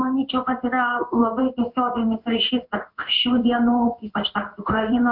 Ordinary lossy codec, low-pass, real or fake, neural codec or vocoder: AAC, 48 kbps; 5.4 kHz; fake; codec, 16 kHz in and 24 kHz out, 1 kbps, XY-Tokenizer